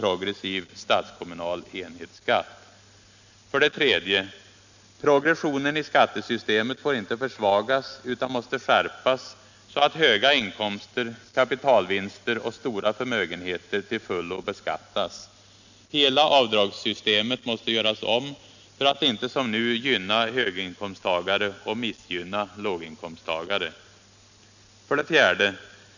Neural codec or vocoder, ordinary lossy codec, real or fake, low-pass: none; none; real; 7.2 kHz